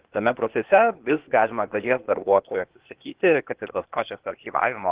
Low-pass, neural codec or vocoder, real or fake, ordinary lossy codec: 3.6 kHz; codec, 16 kHz, 0.8 kbps, ZipCodec; fake; Opus, 16 kbps